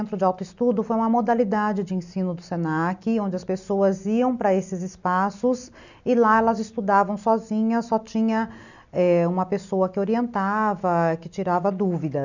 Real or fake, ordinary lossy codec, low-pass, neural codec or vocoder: real; none; 7.2 kHz; none